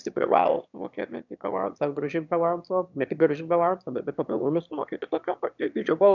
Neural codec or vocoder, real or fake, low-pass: autoencoder, 22.05 kHz, a latent of 192 numbers a frame, VITS, trained on one speaker; fake; 7.2 kHz